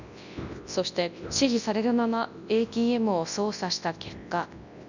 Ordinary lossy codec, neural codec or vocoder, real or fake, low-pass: none; codec, 24 kHz, 0.9 kbps, WavTokenizer, large speech release; fake; 7.2 kHz